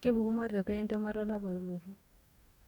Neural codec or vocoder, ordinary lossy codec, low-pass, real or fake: codec, 44.1 kHz, 2.6 kbps, DAC; none; 19.8 kHz; fake